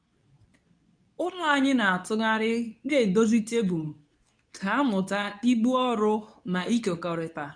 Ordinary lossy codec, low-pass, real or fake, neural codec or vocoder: none; 9.9 kHz; fake; codec, 24 kHz, 0.9 kbps, WavTokenizer, medium speech release version 2